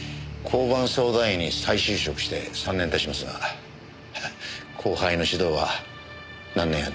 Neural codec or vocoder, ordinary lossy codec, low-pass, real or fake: none; none; none; real